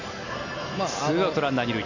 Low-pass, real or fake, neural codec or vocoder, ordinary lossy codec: 7.2 kHz; real; none; AAC, 48 kbps